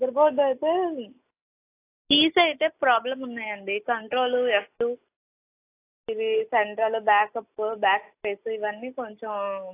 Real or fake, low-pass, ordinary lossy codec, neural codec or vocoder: real; 3.6 kHz; AAC, 24 kbps; none